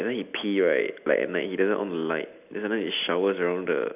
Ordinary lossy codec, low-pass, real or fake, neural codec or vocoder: none; 3.6 kHz; real; none